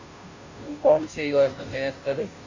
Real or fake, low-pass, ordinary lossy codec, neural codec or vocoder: fake; 7.2 kHz; AAC, 48 kbps; codec, 16 kHz, 0.5 kbps, FunCodec, trained on Chinese and English, 25 frames a second